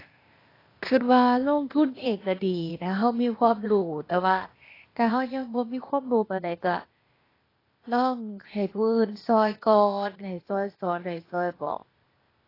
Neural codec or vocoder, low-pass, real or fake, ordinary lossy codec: codec, 16 kHz, 0.8 kbps, ZipCodec; 5.4 kHz; fake; AAC, 24 kbps